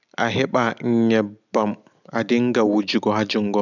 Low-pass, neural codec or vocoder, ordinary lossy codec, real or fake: 7.2 kHz; none; none; real